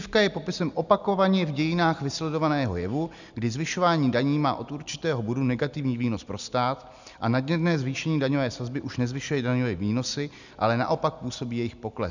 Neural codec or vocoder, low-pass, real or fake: none; 7.2 kHz; real